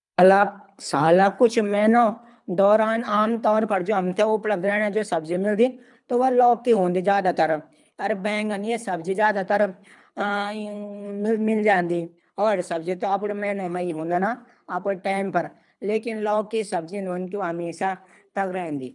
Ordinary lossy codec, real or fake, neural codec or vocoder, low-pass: none; fake; codec, 24 kHz, 3 kbps, HILCodec; 10.8 kHz